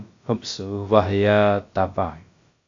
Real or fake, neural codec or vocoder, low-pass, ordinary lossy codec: fake; codec, 16 kHz, about 1 kbps, DyCAST, with the encoder's durations; 7.2 kHz; AAC, 48 kbps